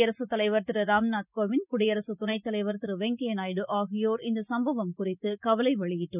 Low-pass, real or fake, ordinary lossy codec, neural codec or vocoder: 3.6 kHz; real; none; none